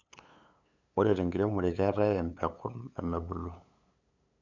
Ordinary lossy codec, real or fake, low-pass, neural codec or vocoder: none; fake; 7.2 kHz; codec, 16 kHz, 8 kbps, FunCodec, trained on LibriTTS, 25 frames a second